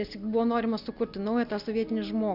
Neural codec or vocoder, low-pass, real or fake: none; 5.4 kHz; real